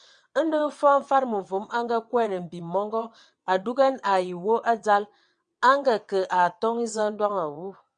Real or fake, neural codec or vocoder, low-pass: fake; vocoder, 22.05 kHz, 80 mel bands, WaveNeXt; 9.9 kHz